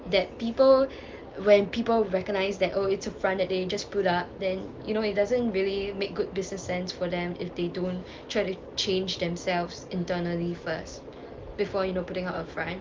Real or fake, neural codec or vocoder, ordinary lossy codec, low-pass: real; none; Opus, 24 kbps; 7.2 kHz